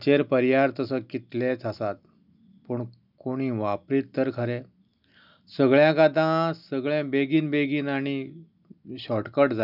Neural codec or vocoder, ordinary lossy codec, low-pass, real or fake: none; none; 5.4 kHz; real